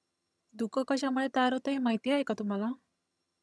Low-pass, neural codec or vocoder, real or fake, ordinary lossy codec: none; vocoder, 22.05 kHz, 80 mel bands, HiFi-GAN; fake; none